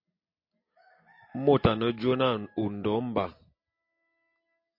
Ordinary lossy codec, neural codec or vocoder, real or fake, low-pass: MP3, 32 kbps; none; real; 5.4 kHz